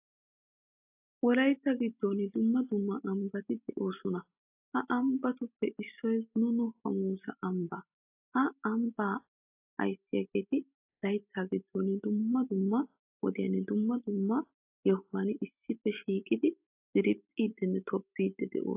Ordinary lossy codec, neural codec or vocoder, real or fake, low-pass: AAC, 32 kbps; none; real; 3.6 kHz